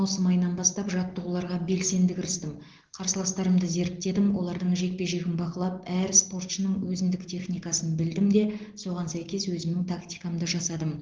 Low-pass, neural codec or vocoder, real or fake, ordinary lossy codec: 7.2 kHz; none; real; Opus, 16 kbps